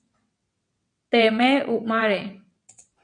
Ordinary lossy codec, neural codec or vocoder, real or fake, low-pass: MP3, 64 kbps; vocoder, 22.05 kHz, 80 mel bands, WaveNeXt; fake; 9.9 kHz